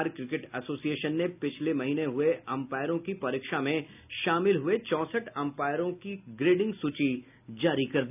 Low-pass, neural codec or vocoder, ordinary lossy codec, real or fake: 3.6 kHz; none; none; real